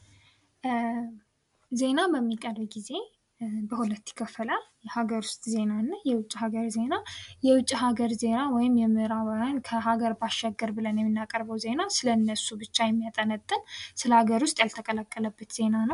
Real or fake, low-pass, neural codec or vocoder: real; 10.8 kHz; none